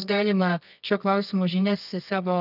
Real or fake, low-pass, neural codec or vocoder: fake; 5.4 kHz; codec, 24 kHz, 0.9 kbps, WavTokenizer, medium music audio release